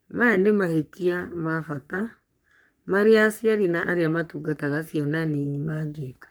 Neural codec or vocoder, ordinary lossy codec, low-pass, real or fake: codec, 44.1 kHz, 3.4 kbps, Pupu-Codec; none; none; fake